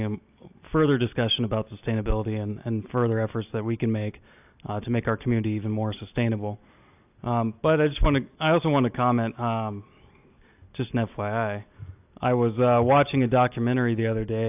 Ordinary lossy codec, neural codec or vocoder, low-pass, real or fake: AAC, 32 kbps; none; 3.6 kHz; real